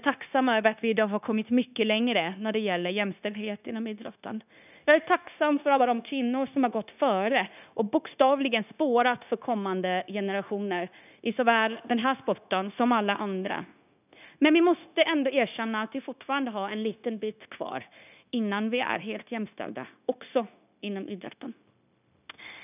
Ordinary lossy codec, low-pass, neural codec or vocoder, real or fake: none; 3.6 kHz; codec, 16 kHz, 0.9 kbps, LongCat-Audio-Codec; fake